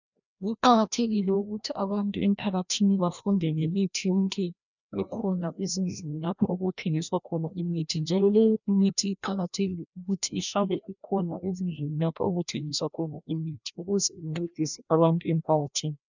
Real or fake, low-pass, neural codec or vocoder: fake; 7.2 kHz; codec, 16 kHz, 1 kbps, FreqCodec, larger model